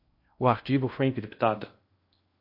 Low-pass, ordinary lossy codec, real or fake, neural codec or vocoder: 5.4 kHz; MP3, 32 kbps; fake; codec, 16 kHz in and 24 kHz out, 0.6 kbps, FocalCodec, streaming, 2048 codes